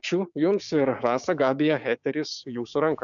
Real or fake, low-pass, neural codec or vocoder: fake; 7.2 kHz; codec, 16 kHz, 6 kbps, DAC